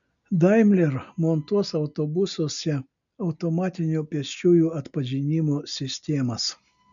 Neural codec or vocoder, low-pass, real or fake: none; 7.2 kHz; real